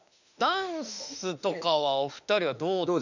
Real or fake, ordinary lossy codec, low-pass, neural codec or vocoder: fake; none; 7.2 kHz; autoencoder, 48 kHz, 32 numbers a frame, DAC-VAE, trained on Japanese speech